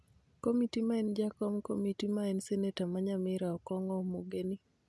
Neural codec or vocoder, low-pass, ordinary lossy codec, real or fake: none; none; none; real